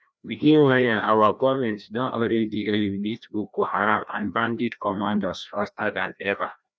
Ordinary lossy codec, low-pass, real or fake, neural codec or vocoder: none; none; fake; codec, 16 kHz, 1 kbps, FreqCodec, larger model